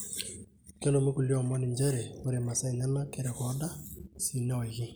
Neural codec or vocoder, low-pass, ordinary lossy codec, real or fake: none; none; none; real